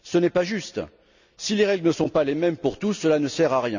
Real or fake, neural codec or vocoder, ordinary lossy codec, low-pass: real; none; none; 7.2 kHz